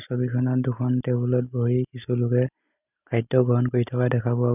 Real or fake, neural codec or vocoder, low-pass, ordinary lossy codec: real; none; 3.6 kHz; none